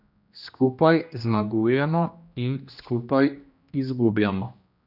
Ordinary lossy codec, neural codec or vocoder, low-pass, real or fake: none; codec, 16 kHz, 1 kbps, X-Codec, HuBERT features, trained on general audio; 5.4 kHz; fake